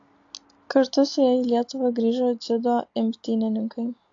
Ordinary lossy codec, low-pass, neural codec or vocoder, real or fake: AAC, 48 kbps; 7.2 kHz; none; real